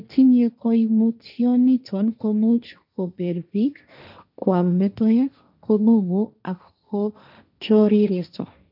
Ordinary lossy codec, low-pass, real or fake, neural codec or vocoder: none; 5.4 kHz; fake; codec, 16 kHz, 1.1 kbps, Voila-Tokenizer